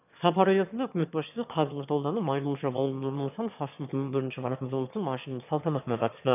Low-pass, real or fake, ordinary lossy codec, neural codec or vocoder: 3.6 kHz; fake; none; autoencoder, 22.05 kHz, a latent of 192 numbers a frame, VITS, trained on one speaker